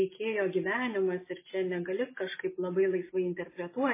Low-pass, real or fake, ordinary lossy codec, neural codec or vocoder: 3.6 kHz; real; MP3, 16 kbps; none